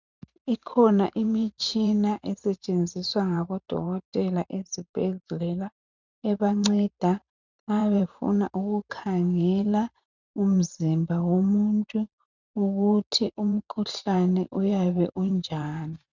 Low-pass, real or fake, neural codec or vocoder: 7.2 kHz; fake; vocoder, 44.1 kHz, 128 mel bands every 512 samples, BigVGAN v2